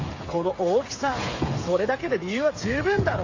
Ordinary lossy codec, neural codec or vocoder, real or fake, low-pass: AAC, 32 kbps; codec, 16 kHz, 8 kbps, FreqCodec, smaller model; fake; 7.2 kHz